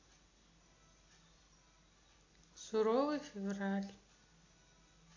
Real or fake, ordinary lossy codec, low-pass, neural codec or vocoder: real; AAC, 32 kbps; 7.2 kHz; none